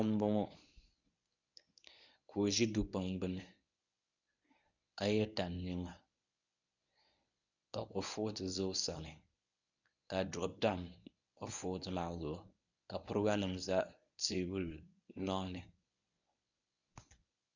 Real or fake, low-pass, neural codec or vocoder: fake; 7.2 kHz; codec, 24 kHz, 0.9 kbps, WavTokenizer, medium speech release version 1